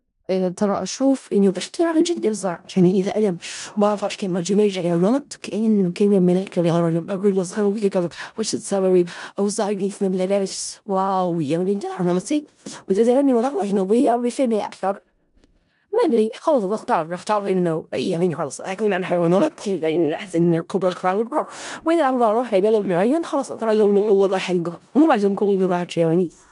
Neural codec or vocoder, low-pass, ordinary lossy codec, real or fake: codec, 16 kHz in and 24 kHz out, 0.4 kbps, LongCat-Audio-Codec, four codebook decoder; 10.8 kHz; MP3, 96 kbps; fake